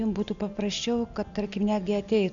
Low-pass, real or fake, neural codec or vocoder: 7.2 kHz; real; none